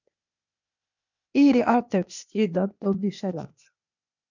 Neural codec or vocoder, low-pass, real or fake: codec, 16 kHz, 0.8 kbps, ZipCodec; 7.2 kHz; fake